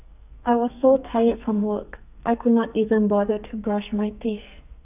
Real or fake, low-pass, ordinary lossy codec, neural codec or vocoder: fake; 3.6 kHz; none; codec, 44.1 kHz, 2.6 kbps, SNAC